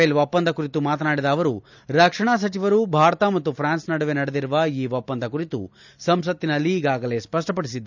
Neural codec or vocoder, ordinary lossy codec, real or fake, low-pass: none; none; real; 7.2 kHz